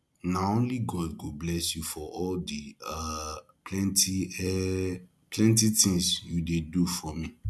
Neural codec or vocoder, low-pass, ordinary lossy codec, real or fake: none; none; none; real